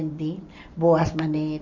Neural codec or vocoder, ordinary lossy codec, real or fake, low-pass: none; none; real; 7.2 kHz